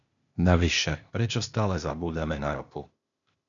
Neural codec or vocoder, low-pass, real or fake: codec, 16 kHz, 0.8 kbps, ZipCodec; 7.2 kHz; fake